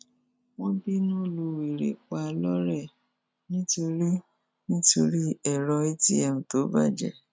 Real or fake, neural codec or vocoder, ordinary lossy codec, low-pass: real; none; none; none